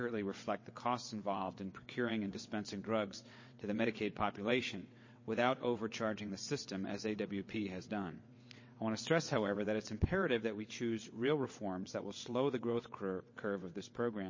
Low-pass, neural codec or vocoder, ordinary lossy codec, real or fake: 7.2 kHz; vocoder, 22.05 kHz, 80 mel bands, WaveNeXt; MP3, 32 kbps; fake